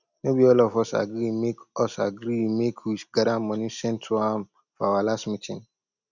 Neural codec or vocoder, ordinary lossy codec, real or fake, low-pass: none; none; real; 7.2 kHz